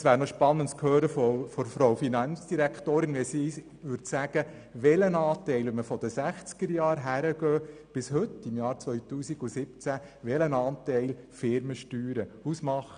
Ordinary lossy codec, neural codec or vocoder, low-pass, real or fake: none; none; 9.9 kHz; real